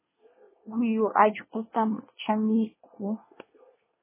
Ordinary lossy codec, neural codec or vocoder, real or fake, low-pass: MP3, 16 kbps; codec, 24 kHz, 1 kbps, SNAC; fake; 3.6 kHz